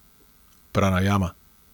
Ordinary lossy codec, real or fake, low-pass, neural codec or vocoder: none; real; none; none